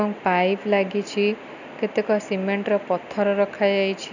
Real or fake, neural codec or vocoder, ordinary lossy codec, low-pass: real; none; none; 7.2 kHz